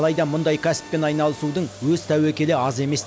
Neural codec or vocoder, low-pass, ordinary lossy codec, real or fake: none; none; none; real